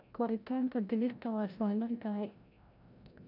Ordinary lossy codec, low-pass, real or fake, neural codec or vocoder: none; 5.4 kHz; fake; codec, 16 kHz, 0.5 kbps, FreqCodec, larger model